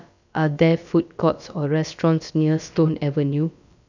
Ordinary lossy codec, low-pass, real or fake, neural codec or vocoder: none; 7.2 kHz; fake; codec, 16 kHz, about 1 kbps, DyCAST, with the encoder's durations